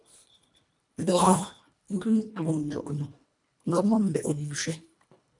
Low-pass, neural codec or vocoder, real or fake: 10.8 kHz; codec, 24 kHz, 1.5 kbps, HILCodec; fake